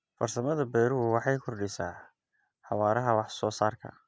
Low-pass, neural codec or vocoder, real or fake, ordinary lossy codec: none; none; real; none